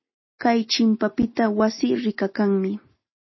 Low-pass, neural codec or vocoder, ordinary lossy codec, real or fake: 7.2 kHz; none; MP3, 24 kbps; real